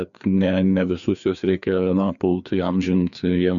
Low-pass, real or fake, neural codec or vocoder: 7.2 kHz; fake; codec, 16 kHz, 2 kbps, FreqCodec, larger model